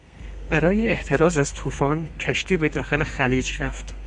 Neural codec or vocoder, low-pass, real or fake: codec, 32 kHz, 1.9 kbps, SNAC; 10.8 kHz; fake